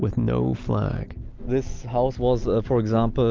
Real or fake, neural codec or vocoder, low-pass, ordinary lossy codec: real; none; 7.2 kHz; Opus, 32 kbps